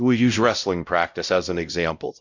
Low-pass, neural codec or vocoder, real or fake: 7.2 kHz; codec, 16 kHz, 0.5 kbps, X-Codec, WavLM features, trained on Multilingual LibriSpeech; fake